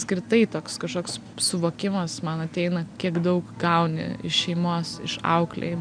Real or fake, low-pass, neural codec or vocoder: real; 9.9 kHz; none